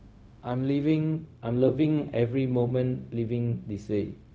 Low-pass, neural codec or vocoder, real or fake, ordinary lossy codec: none; codec, 16 kHz, 0.4 kbps, LongCat-Audio-Codec; fake; none